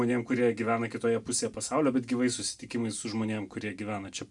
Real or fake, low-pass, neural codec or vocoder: real; 10.8 kHz; none